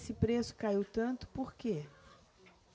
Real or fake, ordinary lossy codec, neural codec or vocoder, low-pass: real; none; none; none